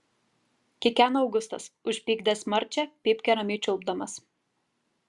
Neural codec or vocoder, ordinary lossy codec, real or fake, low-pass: none; Opus, 64 kbps; real; 10.8 kHz